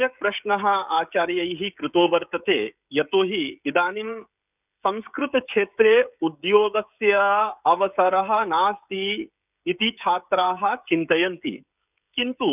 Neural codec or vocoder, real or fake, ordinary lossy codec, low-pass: codec, 16 kHz, 16 kbps, FreqCodec, smaller model; fake; none; 3.6 kHz